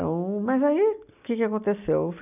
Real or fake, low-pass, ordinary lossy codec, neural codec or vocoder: real; 3.6 kHz; none; none